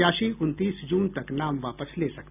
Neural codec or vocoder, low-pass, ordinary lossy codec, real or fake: none; 3.6 kHz; none; real